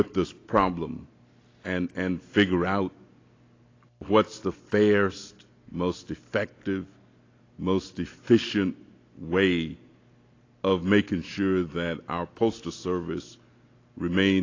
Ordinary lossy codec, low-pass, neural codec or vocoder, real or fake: AAC, 32 kbps; 7.2 kHz; none; real